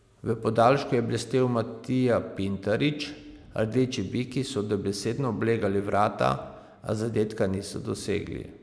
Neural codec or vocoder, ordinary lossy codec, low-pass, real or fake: none; none; none; real